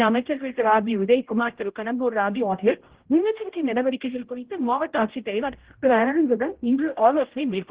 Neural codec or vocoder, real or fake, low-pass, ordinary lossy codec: codec, 16 kHz, 0.5 kbps, X-Codec, HuBERT features, trained on general audio; fake; 3.6 kHz; Opus, 16 kbps